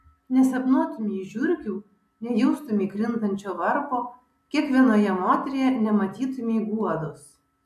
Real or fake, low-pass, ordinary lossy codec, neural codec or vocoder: real; 14.4 kHz; AAC, 96 kbps; none